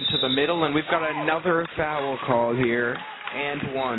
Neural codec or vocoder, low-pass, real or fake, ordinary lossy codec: none; 7.2 kHz; real; AAC, 16 kbps